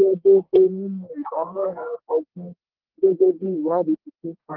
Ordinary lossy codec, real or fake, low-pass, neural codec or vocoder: Opus, 16 kbps; fake; 5.4 kHz; codec, 44.1 kHz, 1.7 kbps, Pupu-Codec